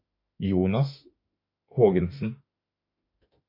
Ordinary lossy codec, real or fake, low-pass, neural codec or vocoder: MP3, 32 kbps; fake; 5.4 kHz; autoencoder, 48 kHz, 32 numbers a frame, DAC-VAE, trained on Japanese speech